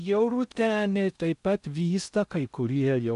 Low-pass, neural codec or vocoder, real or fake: 10.8 kHz; codec, 16 kHz in and 24 kHz out, 0.6 kbps, FocalCodec, streaming, 2048 codes; fake